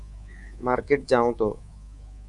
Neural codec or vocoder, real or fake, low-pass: codec, 24 kHz, 3.1 kbps, DualCodec; fake; 10.8 kHz